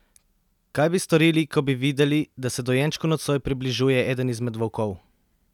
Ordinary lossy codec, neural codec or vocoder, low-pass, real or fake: none; none; 19.8 kHz; real